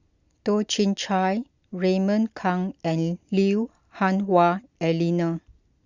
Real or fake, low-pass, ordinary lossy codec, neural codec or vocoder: real; 7.2 kHz; Opus, 64 kbps; none